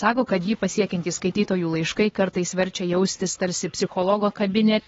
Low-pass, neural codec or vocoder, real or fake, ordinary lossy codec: 7.2 kHz; codec, 16 kHz, 4 kbps, X-Codec, HuBERT features, trained on LibriSpeech; fake; AAC, 24 kbps